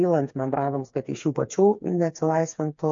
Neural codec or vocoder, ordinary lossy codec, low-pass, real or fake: codec, 16 kHz, 4 kbps, FreqCodec, smaller model; MP3, 48 kbps; 7.2 kHz; fake